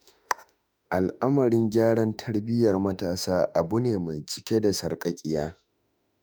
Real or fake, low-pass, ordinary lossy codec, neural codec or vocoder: fake; none; none; autoencoder, 48 kHz, 32 numbers a frame, DAC-VAE, trained on Japanese speech